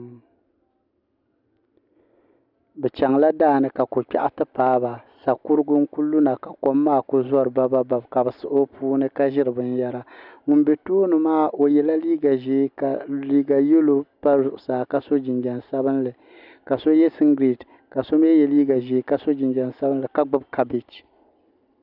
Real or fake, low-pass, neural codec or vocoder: real; 5.4 kHz; none